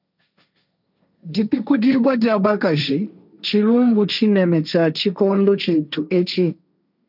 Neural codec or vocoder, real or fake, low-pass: codec, 16 kHz, 1.1 kbps, Voila-Tokenizer; fake; 5.4 kHz